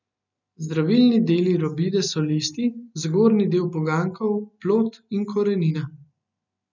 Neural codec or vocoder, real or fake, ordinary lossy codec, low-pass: none; real; none; 7.2 kHz